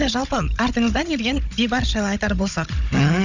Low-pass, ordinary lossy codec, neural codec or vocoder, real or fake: 7.2 kHz; none; codec, 16 kHz, 16 kbps, FunCodec, trained on Chinese and English, 50 frames a second; fake